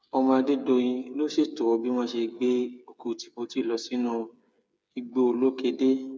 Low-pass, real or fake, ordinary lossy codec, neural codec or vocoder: none; fake; none; codec, 16 kHz, 8 kbps, FreqCodec, smaller model